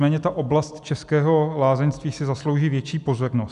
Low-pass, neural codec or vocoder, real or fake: 10.8 kHz; none; real